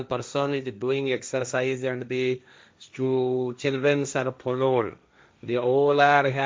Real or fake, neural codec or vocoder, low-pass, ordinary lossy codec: fake; codec, 16 kHz, 1.1 kbps, Voila-Tokenizer; none; none